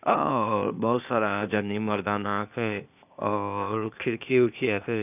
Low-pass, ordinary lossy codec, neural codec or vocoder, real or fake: 3.6 kHz; none; codec, 16 kHz, 0.8 kbps, ZipCodec; fake